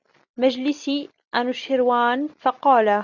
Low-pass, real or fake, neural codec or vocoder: 7.2 kHz; real; none